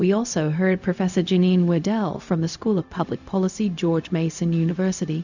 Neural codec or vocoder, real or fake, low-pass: codec, 16 kHz, 0.4 kbps, LongCat-Audio-Codec; fake; 7.2 kHz